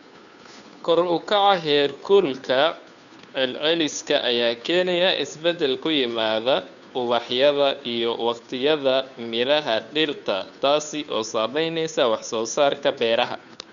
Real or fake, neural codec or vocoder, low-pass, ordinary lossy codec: fake; codec, 16 kHz, 2 kbps, FunCodec, trained on Chinese and English, 25 frames a second; 7.2 kHz; none